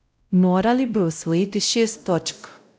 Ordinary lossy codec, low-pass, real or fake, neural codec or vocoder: none; none; fake; codec, 16 kHz, 0.5 kbps, X-Codec, WavLM features, trained on Multilingual LibriSpeech